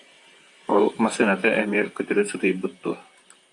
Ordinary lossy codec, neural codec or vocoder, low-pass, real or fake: AAC, 64 kbps; vocoder, 44.1 kHz, 128 mel bands, Pupu-Vocoder; 10.8 kHz; fake